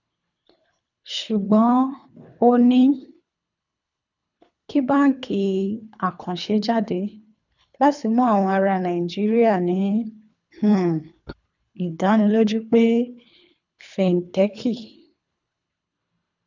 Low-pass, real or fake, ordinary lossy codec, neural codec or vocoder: 7.2 kHz; fake; none; codec, 24 kHz, 3 kbps, HILCodec